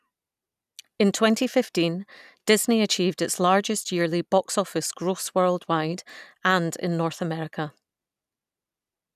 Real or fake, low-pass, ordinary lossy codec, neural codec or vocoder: real; 14.4 kHz; none; none